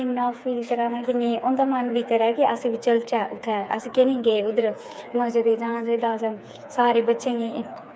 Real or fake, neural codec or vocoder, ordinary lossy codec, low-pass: fake; codec, 16 kHz, 4 kbps, FreqCodec, smaller model; none; none